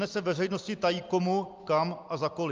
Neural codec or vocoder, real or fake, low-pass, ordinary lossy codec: none; real; 7.2 kHz; Opus, 24 kbps